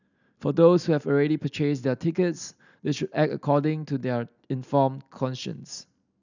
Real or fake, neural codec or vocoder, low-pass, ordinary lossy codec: real; none; 7.2 kHz; none